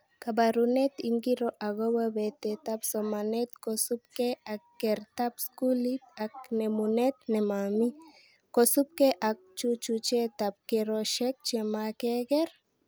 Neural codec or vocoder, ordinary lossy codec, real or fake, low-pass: none; none; real; none